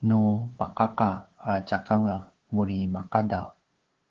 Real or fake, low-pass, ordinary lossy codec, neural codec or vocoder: fake; 7.2 kHz; Opus, 16 kbps; codec, 16 kHz, 2 kbps, FunCodec, trained on LibriTTS, 25 frames a second